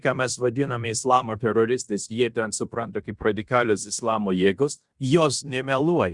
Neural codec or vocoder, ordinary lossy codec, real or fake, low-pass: codec, 16 kHz in and 24 kHz out, 0.9 kbps, LongCat-Audio-Codec, fine tuned four codebook decoder; Opus, 64 kbps; fake; 10.8 kHz